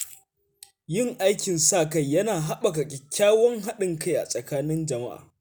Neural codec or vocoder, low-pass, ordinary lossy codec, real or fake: none; none; none; real